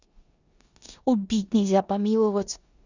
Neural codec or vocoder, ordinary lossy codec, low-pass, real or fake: codec, 16 kHz in and 24 kHz out, 0.9 kbps, LongCat-Audio-Codec, four codebook decoder; Opus, 64 kbps; 7.2 kHz; fake